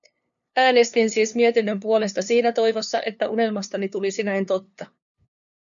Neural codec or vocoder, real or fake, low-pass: codec, 16 kHz, 2 kbps, FunCodec, trained on LibriTTS, 25 frames a second; fake; 7.2 kHz